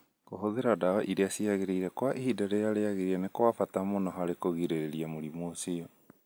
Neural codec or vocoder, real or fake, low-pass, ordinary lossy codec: none; real; none; none